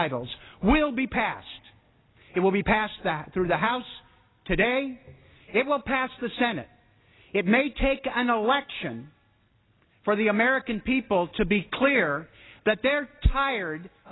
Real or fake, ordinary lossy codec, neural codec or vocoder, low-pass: real; AAC, 16 kbps; none; 7.2 kHz